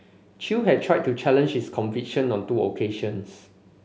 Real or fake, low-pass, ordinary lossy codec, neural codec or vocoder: real; none; none; none